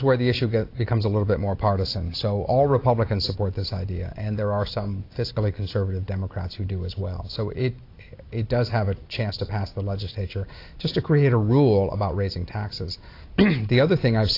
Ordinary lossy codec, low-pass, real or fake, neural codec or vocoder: AAC, 32 kbps; 5.4 kHz; real; none